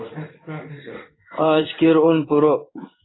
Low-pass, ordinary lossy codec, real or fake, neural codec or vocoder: 7.2 kHz; AAC, 16 kbps; fake; codec, 16 kHz in and 24 kHz out, 1 kbps, XY-Tokenizer